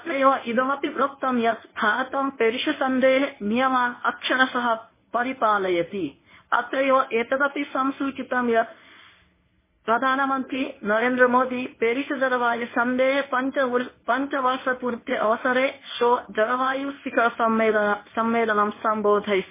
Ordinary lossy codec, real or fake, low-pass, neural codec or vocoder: MP3, 16 kbps; fake; 3.6 kHz; codec, 24 kHz, 0.9 kbps, WavTokenizer, medium speech release version 1